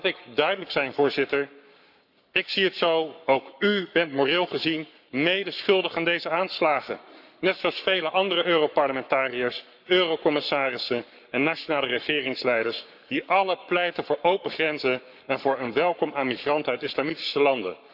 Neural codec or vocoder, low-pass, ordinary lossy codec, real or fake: codec, 44.1 kHz, 7.8 kbps, Pupu-Codec; 5.4 kHz; none; fake